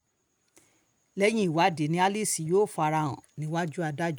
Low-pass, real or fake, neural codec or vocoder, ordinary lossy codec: none; real; none; none